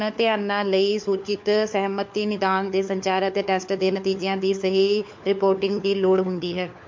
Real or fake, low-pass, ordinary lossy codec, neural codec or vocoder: fake; 7.2 kHz; MP3, 48 kbps; codec, 16 kHz, 4 kbps, FunCodec, trained on LibriTTS, 50 frames a second